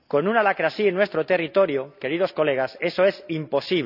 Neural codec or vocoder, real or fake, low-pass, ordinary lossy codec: none; real; 5.4 kHz; none